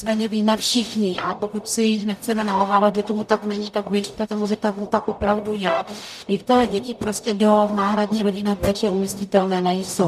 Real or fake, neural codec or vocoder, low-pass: fake; codec, 44.1 kHz, 0.9 kbps, DAC; 14.4 kHz